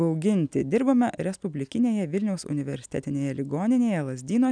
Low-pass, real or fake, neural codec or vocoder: 9.9 kHz; real; none